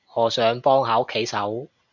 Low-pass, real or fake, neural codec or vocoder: 7.2 kHz; real; none